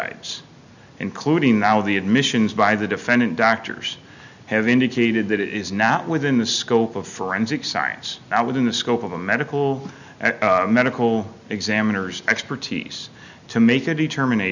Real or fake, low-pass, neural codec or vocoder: real; 7.2 kHz; none